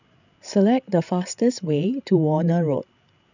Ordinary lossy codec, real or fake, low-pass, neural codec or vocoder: none; fake; 7.2 kHz; codec, 16 kHz, 16 kbps, FreqCodec, larger model